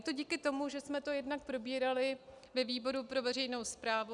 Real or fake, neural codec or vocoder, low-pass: real; none; 10.8 kHz